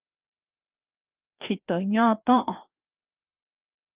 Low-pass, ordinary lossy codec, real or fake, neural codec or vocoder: 3.6 kHz; Opus, 32 kbps; fake; codec, 16 kHz, 2 kbps, FreqCodec, larger model